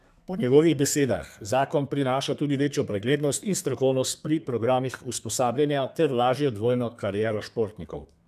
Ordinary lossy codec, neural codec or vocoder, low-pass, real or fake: none; codec, 32 kHz, 1.9 kbps, SNAC; 14.4 kHz; fake